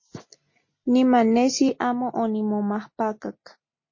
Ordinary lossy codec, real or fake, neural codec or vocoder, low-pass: MP3, 32 kbps; real; none; 7.2 kHz